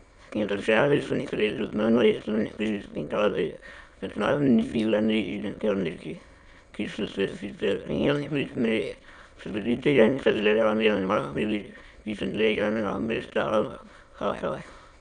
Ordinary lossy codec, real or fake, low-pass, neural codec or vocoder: none; fake; 9.9 kHz; autoencoder, 22.05 kHz, a latent of 192 numbers a frame, VITS, trained on many speakers